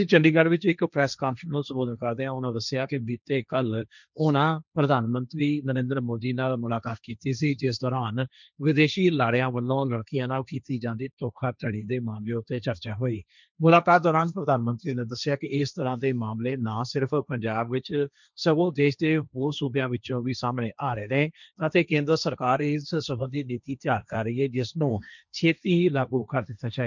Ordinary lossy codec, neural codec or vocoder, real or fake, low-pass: none; codec, 16 kHz, 1.1 kbps, Voila-Tokenizer; fake; 7.2 kHz